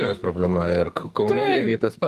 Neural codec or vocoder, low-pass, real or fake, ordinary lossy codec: codec, 44.1 kHz, 2.6 kbps, SNAC; 14.4 kHz; fake; Opus, 32 kbps